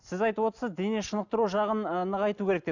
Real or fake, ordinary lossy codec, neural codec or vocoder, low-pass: real; none; none; 7.2 kHz